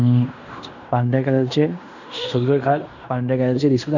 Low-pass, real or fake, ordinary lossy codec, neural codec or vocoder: 7.2 kHz; fake; none; codec, 16 kHz in and 24 kHz out, 0.9 kbps, LongCat-Audio-Codec, fine tuned four codebook decoder